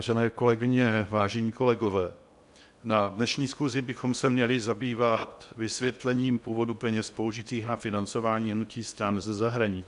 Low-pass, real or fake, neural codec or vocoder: 10.8 kHz; fake; codec, 16 kHz in and 24 kHz out, 0.8 kbps, FocalCodec, streaming, 65536 codes